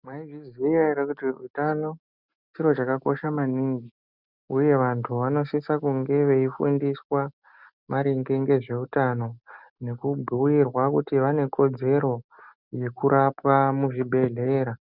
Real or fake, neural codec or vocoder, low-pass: real; none; 5.4 kHz